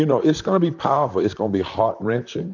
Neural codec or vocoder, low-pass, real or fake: vocoder, 44.1 kHz, 128 mel bands, Pupu-Vocoder; 7.2 kHz; fake